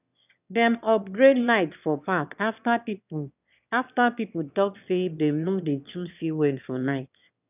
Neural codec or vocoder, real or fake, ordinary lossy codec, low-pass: autoencoder, 22.05 kHz, a latent of 192 numbers a frame, VITS, trained on one speaker; fake; none; 3.6 kHz